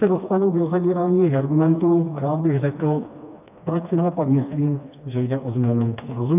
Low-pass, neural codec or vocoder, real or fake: 3.6 kHz; codec, 16 kHz, 2 kbps, FreqCodec, smaller model; fake